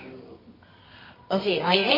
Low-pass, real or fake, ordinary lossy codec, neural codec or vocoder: 5.4 kHz; fake; MP3, 24 kbps; codec, 24 kHz, 0.9 kbps, WavTokenizer, medium music audio release